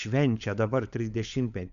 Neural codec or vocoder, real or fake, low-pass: codec, 16 kHz, 16 kbps, FunCodec, trained on LibriTTS, 50 frames a second; fake; 7.2 kHz